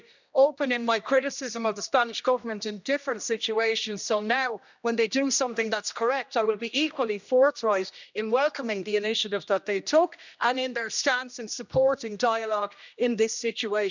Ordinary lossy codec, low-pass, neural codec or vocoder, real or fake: none; 7.2 kHz; codec, 16 kHz, 1 kbps, X-Codec, HuBERT features, trained on general audio; fake